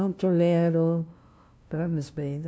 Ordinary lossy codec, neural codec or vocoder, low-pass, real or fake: none; codec, 16 kHz, 0.5 kbps, FunCodec, trained on LibriTTS, 25 frames a second; none; fake